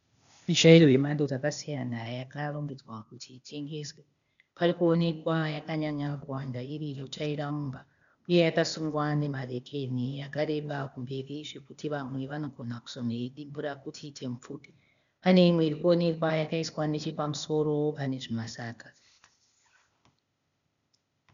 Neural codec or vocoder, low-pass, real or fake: codec, 16 kHz, 0.8 kbps, ZipCodec; 7.2 kHz; fake